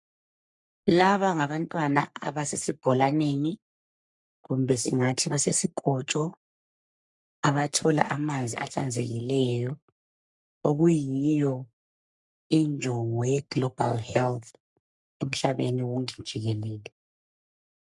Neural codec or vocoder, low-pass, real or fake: codec, 44.1 kHz, 3.4 kbps, Pupu-Codec; 10.8 kHz; fake